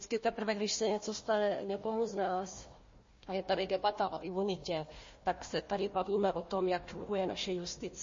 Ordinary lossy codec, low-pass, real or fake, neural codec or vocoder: MP3, 32 kbps; 7.2 kHz; fake; codec, 16 kHz, 1 kbps, FunCodec, trained on Chinese and English, 50 frames a second